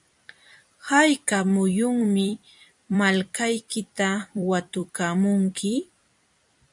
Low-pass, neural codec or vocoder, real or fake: 10.8 kHz; vocoder, 44.1 kHz, 128 mel bands every 256 samples, BigVGAN v2; fake